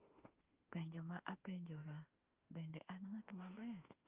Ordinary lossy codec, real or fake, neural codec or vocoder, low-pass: Opus, 32 kbps; fake; autoencoder, 48 kHz, 32 numbers a frame, DAC-VAE, trained on Japanese speech; 3.6 kHz